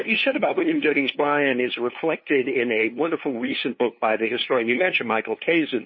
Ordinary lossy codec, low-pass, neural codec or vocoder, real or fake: MP3, 24 kbps; 7.2 kHz; codec, 16 kHz, 2 kbps, FunCodec, trained on LibriTTS, 25 frames a second; fake